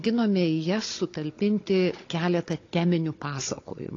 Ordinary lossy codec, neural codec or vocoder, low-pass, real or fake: AAC, 32 kbps; codec, 16 kHz, 16 kbps, FunCodec, trained on Chinese and English, 50 frames a second; 7.2 kHz; fake